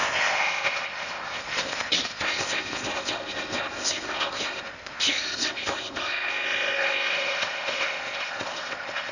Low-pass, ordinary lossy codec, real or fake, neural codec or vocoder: 7.2 kHz; none; fake; codec, 16 kHz in and 24 kHz out, 0.8 kbps, FocalCodec, streaming, 65536 codes